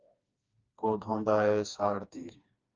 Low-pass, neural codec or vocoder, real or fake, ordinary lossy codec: 7.2 kHz; codec, 16 kHz, 2 kbps, FreqCodec, smaller model; fake; Opus, 24 kbps